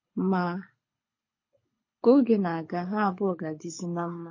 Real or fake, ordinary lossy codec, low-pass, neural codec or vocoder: fake; MP3, 32 kbps; 7.2 kHz; codec, 24 kHz, 6 kbps, HILCodec